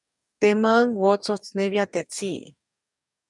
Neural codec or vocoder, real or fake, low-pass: codec, 44.1 kHz, 2.6 kbps, DAC; fake; 10.8 kHz